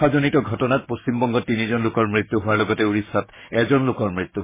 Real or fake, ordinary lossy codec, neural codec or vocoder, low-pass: fake; MP3, 16 kbps; codec, 16 kHz, 6 kbps, DAC; 3.6 kHz